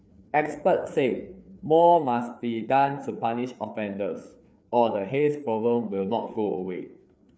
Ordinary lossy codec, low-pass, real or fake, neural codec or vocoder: none; none; fake; codec, 16 kHz, 4 kbps, FreqCodec, larger model